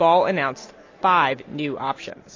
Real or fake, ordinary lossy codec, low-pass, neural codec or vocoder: real; AAC, 32 kbps; 7.2 kHz; none